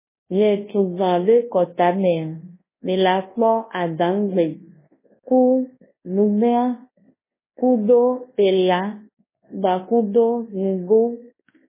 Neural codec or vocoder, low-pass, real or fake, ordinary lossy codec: codec, 24 kHz, 0.9 kbps, WavTokenizer, large speech release; 3.6 kHz; fake; MP3, 16 kbps